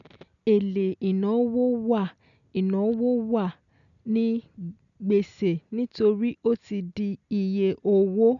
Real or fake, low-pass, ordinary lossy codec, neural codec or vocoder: real; 7.2 kHz; none; none